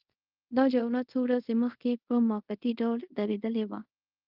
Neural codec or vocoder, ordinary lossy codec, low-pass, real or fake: codec, 24 kHz, 0.5 kbps, DualCodec; Opus, 32 kbps; 5.4 kHz; fake